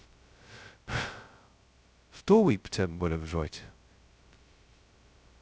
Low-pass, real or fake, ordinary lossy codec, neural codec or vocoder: none; fake; none; codec, 16 kHz, 0.2 kbps, FocalCodec